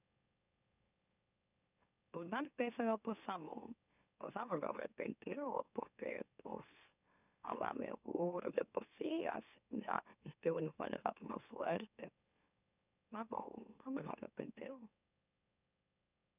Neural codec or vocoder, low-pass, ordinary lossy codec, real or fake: autoencoder, 44.1 kHz, a latent of 192 numbers a frame, MeloTTS; 3.6 kHz; none; fake